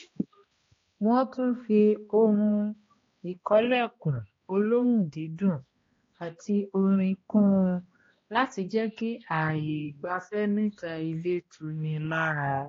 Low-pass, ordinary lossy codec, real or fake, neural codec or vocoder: 7.2 kHz; AAC, 32 kbps; fake; codec, 16 kHz, 1 kbps, X-Codec, HuBERT features, trained on balanced general audio